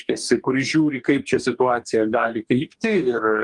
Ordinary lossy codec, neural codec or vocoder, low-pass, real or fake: Opus, 32 kbps; codec, 44.1 kHz, 2.6 kbps, DAC; 10.8 kHz; fake